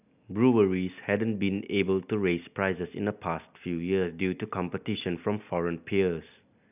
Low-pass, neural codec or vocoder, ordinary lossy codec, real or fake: 3.6 kHz; none; none; real